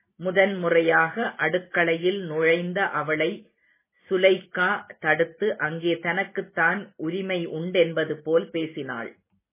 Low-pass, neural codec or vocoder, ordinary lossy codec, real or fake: 3.6 kHz; none; MP3, 16 kbps; real